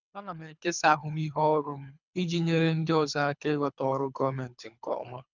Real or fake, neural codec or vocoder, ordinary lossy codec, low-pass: fake; codec, 24 kHz, 3 kbps, HILCodec; none; 7.2 kHz